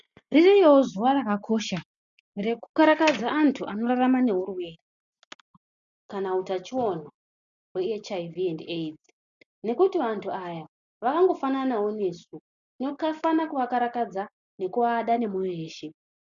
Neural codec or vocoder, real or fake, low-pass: none; real; 7.2 kHz